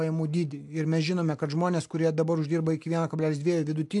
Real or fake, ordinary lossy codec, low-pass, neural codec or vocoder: real; AAC, 64 kbps; 10.8 kHz; none